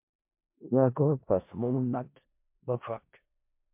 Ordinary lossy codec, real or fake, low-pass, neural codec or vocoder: MP3, 32 kbps; fake; 3.6 kHz; codec, 16 kHz in and 24 kHz out, 0.4 kbps, LongCat-Audio-Codec, four codebook decoder